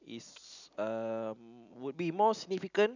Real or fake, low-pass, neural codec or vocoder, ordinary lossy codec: real; 7.2 kHz; none; none